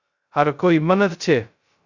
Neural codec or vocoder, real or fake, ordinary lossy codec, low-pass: codec, 16 kHz, 0.2 kbps, FocalCodec; fake; Opus, 64 kbps; 7.2 kHz